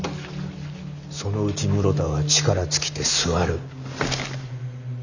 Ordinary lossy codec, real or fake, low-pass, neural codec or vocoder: none; real; 7.2 kHz; none